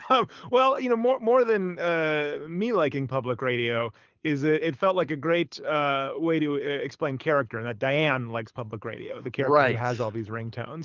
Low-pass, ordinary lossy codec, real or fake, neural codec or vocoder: 7.2 kHz; Opus, 24 kbps; fake; codec, 24 kHz, 6 kbps, HILCodec